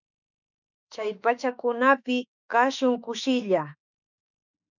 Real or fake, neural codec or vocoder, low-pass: fake; autoencoder, 48 kHz, 32 numbers a frame, DAC-VAE, trained on Japanese speech; 7.2 kHz